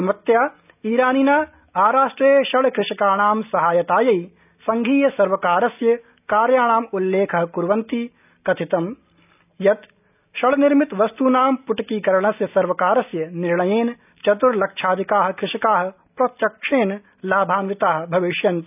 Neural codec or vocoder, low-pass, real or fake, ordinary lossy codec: none; 3.6 kHz; real; none